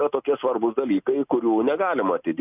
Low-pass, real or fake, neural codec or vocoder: 3.6 kHz; real; none